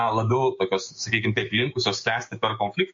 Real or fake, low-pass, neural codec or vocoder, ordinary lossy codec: fake; 7.2 kHz; codec, 16 kHz, 6 kbps, DAC; MP3, 48 kbps